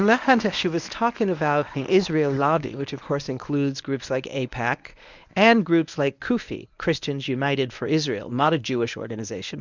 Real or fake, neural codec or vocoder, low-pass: fake; codec, 24 kHz, 0.9 kbps, WavTokenizer, medium speech release version 1; 7.2 kHz